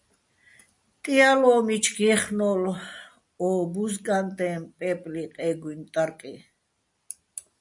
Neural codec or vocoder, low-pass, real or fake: none; 10.8 kHz; real